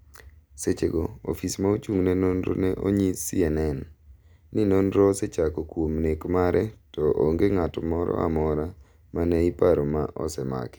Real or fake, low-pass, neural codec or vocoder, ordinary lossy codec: real; none; none; none